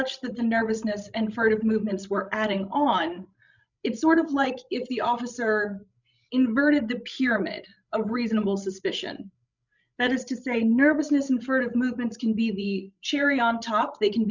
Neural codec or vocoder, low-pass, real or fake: codec, 16 kHz, 16 kbps, FreqCodec, larger model; 7.2 kHz; fake